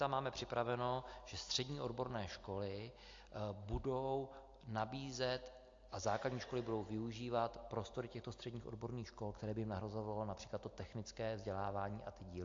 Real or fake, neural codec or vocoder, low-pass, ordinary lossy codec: real; none; 7.2 kHz; AAC, 48 kbps